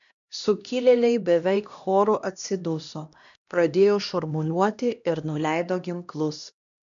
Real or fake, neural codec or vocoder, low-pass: fake; codec, 16 kHz, 1 kbps, X-Codec, HuBERT features, trained on LibriSpeech; 7.2 kHz